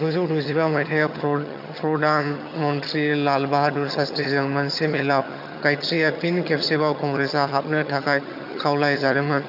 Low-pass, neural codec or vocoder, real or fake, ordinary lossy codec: 5.4 kHz; vocoder, 22.05 kHz, 80 mel bands, HiFi-GAN; fake; none